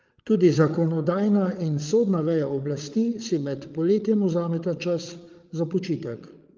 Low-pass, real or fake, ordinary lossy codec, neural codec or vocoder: 7.2 kHz; fake; Opus, 32 kbps; codec, 16 kHz, 8 kbps, FreqCodec, larger model